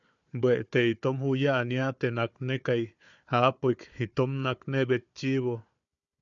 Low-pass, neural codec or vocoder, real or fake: 7.2 kHz; codec, 16 kHz, 4 kbps, FunCodec, trained on Chinese and English, 50 frames a second; fake